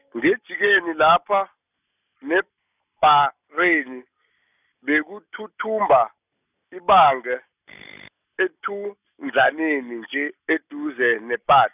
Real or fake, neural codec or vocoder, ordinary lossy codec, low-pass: real; none; none; 3.6 kHz